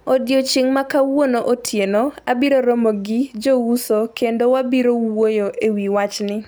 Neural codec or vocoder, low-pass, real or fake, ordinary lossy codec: none; none; real; none